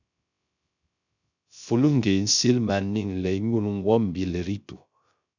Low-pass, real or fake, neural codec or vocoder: 7.2 kHz; fake; codec, 16 kHz, 0.3 kbps, FocalCodec